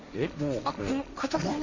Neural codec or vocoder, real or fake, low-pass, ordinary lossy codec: codec, 16 kHz, 1.1 kbps, Voila-Tokenizer; fake; 7.2 kHz; none